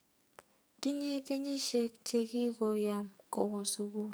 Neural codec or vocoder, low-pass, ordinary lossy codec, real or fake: codec, 44.1 kHz, 2.6 kbps, SNAC; none; none; fake